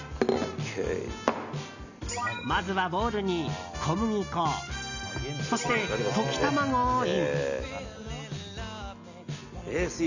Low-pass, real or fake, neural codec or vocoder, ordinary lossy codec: 7.2 kHz; real; none; none